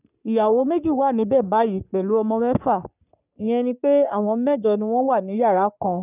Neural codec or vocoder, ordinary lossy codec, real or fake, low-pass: codec, 44.1 kHz, 3.4 kbps, Pupu-Codec; none; fake; 3.6 kHz